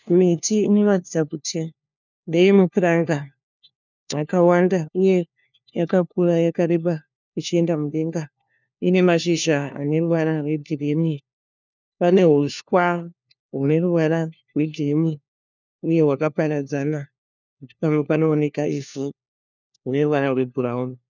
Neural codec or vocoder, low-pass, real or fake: codec, 16 kHz, 1 kbps, FunCodec, trained on LibriTTS, 50 frames a second; 7.2 kHz; fake